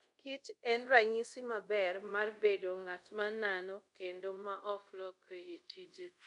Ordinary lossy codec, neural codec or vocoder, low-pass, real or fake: none; codec, 24 kHz, 0.5 kbps, DualCodec; 10.8 kHz; fake